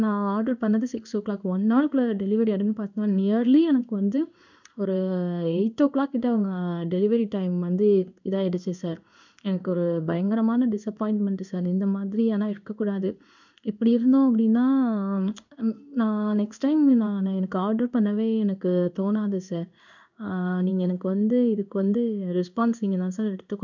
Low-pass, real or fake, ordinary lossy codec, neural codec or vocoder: 7.2 kHz; fake; none; codec, 16 kHz in and 24 kHz out, 1 kbps, XY-Tokenizer